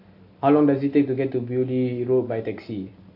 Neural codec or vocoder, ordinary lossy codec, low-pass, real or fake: none; AAC, 32 kbps; 5.4 kHz; real